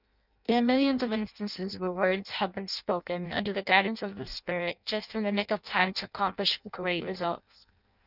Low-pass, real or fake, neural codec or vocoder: 5.4 kHz; fake; codec, 16 kHz in and 24 kHz out, 0.6 kbps, FireRedTTS-2 codec